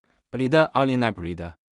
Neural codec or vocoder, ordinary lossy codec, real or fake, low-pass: codec, 16 kHz in and 24 kHz out, 0.4 kbps, LongCat-Audio-Codec, two codebook decoder; Opus, 64 kbps; fake; 10.8 kHz